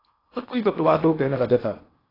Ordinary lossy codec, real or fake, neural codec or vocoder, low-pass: AAC, 24 kbps; fake; codec, 16 kHz in and 24 kHz out, 0.8 kbps, FocalCodec, streaming, 65536 codes; 5.4 kHz